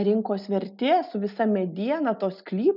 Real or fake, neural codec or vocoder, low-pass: real; none; 5.4 kHz